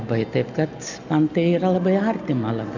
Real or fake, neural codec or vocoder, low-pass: real; none; 7.2 kHz